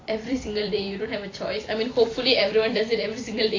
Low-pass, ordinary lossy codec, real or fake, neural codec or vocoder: 7.2 kHz; AAC, 32 kbps; fake; vocoder, 44.1 kHz, 128 mel bands every 256 samples, BigVGAN v2